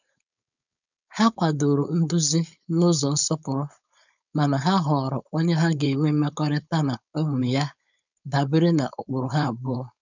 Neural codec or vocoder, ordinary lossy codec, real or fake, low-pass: codec, 16 kHz, 4.8 kbps, FACodec; none; fake; 7.2 kHz